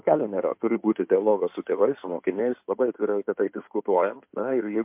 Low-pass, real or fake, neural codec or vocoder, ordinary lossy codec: 3.6 kHz; fake; codec, 16 kHz in and 24 kHz out, 2.2 kbps, FireRedTTS-2 codec; MP3, 32 kbps